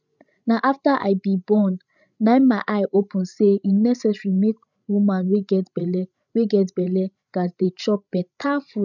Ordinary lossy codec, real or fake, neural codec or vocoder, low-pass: none; fake; codec, 16 kHz, 16 kbps, FreqCodec, larger model; 7.2 kHz